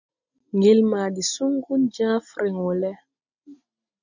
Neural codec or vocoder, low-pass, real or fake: none; 7.2 kHz; real